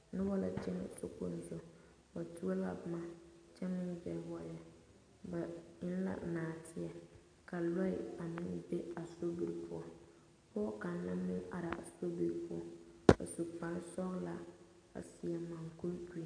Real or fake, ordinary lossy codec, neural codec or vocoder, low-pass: real; AAC, 48 kbps; none; 9.9 kHz